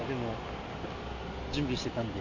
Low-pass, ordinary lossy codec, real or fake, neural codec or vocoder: 7.2 kHz; AAC, 48 kbps; real; none